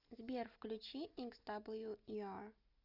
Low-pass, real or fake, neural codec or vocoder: 5.4 kHz; real; none